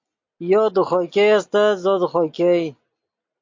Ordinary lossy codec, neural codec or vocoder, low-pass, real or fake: MP3, 48 kbps; none; 7.2 kHz; real